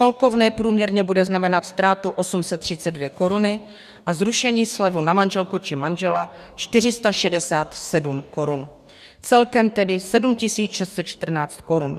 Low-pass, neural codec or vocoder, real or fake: 14.4 kHz; codec, 44.1 kHz, 2.6 kbps, DAC; fake